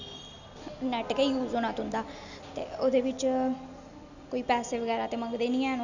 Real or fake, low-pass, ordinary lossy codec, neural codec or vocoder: real; 7.2 kHz; none; none